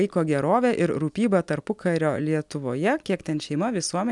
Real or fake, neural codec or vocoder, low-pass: real; none; 10.8 kHz